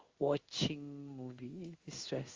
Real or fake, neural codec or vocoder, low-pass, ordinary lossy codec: fake; codec, 16 kHz in and 24 kHz out, 1 kbps, XY-Tokenizer; 7.2 kHz; Opus, 32 kbps